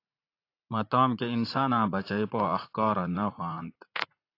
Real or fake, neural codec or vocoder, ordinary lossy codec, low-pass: fake; vocoder, 44.1 kHz, 80 mel bands, Vocos; AAC, 32 kbps; 5.4 kHz